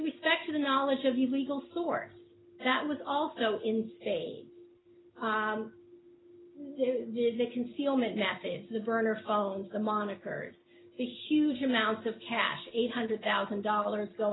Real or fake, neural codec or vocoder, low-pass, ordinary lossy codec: real; none; 7.2 kHz; AAC, 16 kbps